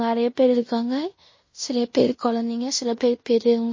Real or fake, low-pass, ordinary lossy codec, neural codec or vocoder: fake; 7.2 kHz; MP3, 32 kbps; codec, 16 kHz in and 24 kHz out, 0.9 kbps, LongCat-Audio-Codec, fine tuned four codebook decoder